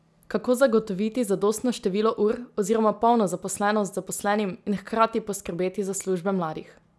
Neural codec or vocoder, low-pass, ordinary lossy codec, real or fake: none; none; none; real